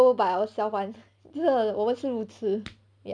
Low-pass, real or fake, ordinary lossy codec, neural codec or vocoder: 9.9 kHz; real; MP3, 96 kbps; none